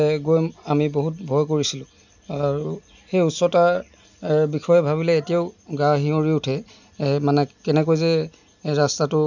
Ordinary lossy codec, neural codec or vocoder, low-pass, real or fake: none; none; 7.2 kHz; real